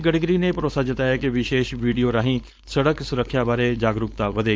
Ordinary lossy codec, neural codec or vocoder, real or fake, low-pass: none; codec, 16 kHz, 4.8 kbps, FACodec; fake; none